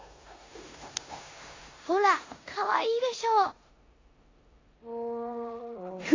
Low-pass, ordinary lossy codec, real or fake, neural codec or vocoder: 7.2 kHz; AAC, 32 kbps; fake; codec, 16 kHz in and 24 kHz out, 0.9 kbps, LongCat-Audio-Codec, four codebook decoder